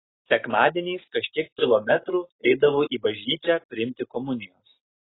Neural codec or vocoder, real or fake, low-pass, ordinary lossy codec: none; real; 7.2 kHz; AAC, 16 kbps